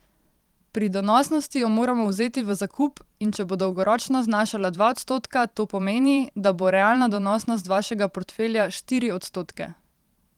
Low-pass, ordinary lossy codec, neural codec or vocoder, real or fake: 19.8 kHz; Opus, 24 kbps; vocoder, 44.1 kHz, 128 mel bands every 512 samples, BigVGAN v2; fake